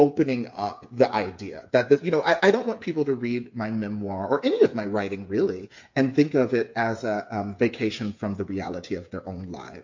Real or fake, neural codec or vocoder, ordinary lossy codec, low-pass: fake; codec, 16 kHz, 8 kbps, FreqCodec, smaller model; MP3, 48 kbps; 7.2 kHz